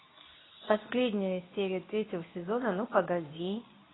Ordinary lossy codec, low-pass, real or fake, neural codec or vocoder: AAC, 16 kbps; 7.2 kHz; fake; codec, 24 kHz, 0.9 kbps, WavTokenizer, medium speech release version 2